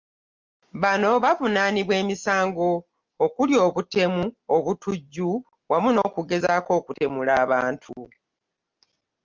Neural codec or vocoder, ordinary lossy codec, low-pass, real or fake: none; Opus, 32 kbps; 7.2 kHz; real